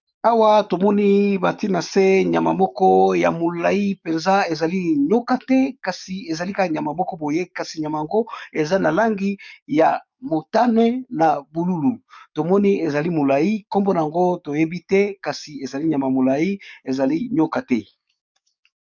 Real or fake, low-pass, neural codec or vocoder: fake; 7.2 kHz; codec, 44.1 kHz, 7.8 kbps, DAC